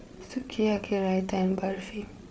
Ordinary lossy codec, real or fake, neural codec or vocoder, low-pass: none; fake; codec, 16 kHz, 8 kbps, FreqCodec, larger model; none